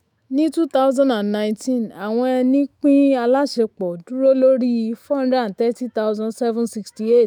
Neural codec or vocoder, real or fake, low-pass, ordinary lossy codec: autoencoder, 48 kHz, 128 numbers a frame, DAC-VAE, trained on Japanese speech; fake; 19.8 kHz; none